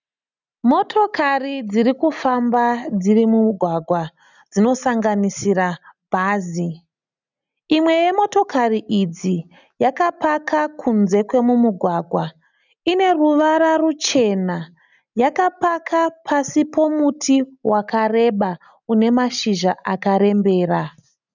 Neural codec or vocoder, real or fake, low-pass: none; real; 7.2 kHz